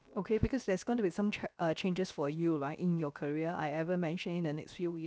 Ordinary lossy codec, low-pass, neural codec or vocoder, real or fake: none; none; codec, 16 kHz, about 1 kbps, DyCAST, with the encoder's durations; fake